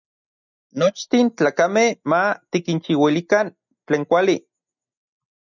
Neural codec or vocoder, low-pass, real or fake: none; 7.2 kHz; real